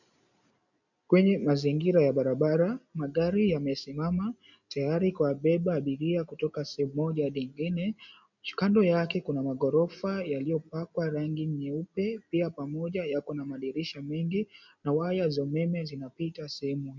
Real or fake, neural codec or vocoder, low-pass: real; none; 7.2 kHz